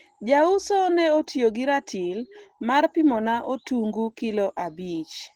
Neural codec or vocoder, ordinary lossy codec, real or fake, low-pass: none; Opus, 16 kbps; real; 19.8 kHz